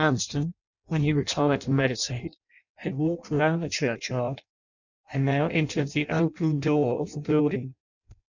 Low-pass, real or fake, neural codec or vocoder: 7.2 kHz; fake; codec, 16 kHz in and 24 kHz out, 0.6 kbps, FireRedTTS-2 codec